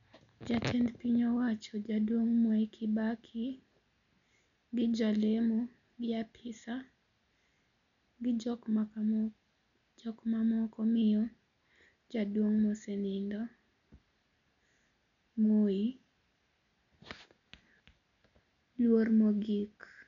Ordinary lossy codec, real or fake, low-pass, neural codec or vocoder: none; real; 7.2 kHz; none